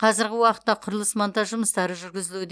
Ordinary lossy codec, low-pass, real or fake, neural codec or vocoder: none; none; real; none